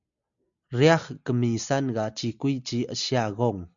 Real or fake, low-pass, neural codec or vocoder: real; 7.2 kHz; none